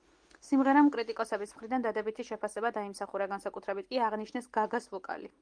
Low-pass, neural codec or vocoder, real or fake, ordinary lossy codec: 9.9 kHz; none; real; Opus, 24 kbps